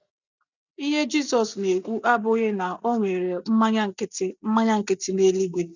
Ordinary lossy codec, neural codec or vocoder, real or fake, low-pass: none; none; real; 7.2 kHz